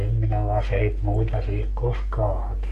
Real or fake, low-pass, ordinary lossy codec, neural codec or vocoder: fake; 14.4 kHz; none; codec, 44.1 kHz, 3.4 kbps, Pupu-Codec